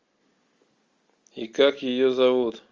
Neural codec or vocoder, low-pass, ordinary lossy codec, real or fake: none; 7.2 kHz; Opus, 32 kbps; real